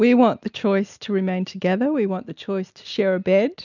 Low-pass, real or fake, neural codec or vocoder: 7.2 kHz; real; none